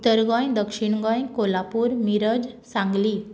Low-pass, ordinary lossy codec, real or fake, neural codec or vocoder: none; none; real; none